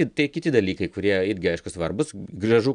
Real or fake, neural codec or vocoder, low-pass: real; none; 9.9 kHz